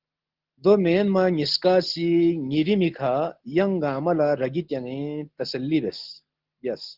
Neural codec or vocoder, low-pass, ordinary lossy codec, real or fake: none; 5.4 kHz; Opus, 16 kbps; real